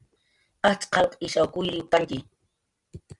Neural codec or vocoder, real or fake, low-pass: none; real; 10.8 kHz